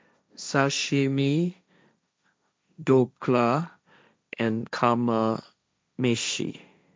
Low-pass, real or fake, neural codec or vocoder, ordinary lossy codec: none; fake; codec, 16 kHz, 1.1 kbps, Voila-Tokenizer; none